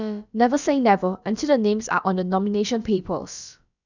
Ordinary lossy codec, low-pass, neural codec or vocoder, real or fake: none; 7.2 kHz; codec, 16 kHz, about 1 kbps, DyCAST, with the encoder's durations; fake